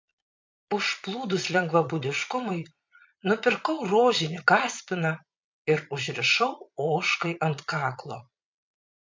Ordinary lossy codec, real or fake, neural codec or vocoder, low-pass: MP3, 48 kbps; fake; vocoder, 22.05 kHz, 80 mel bands, Vocos; 7.2 kHz